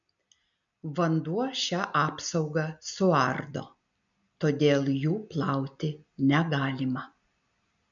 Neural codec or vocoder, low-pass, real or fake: none; 7.2 kHz; real